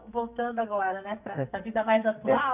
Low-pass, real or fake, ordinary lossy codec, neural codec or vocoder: 3.6 kHz; fake; none; codec, 44.1 kHz, 2.6 kbps, SNAC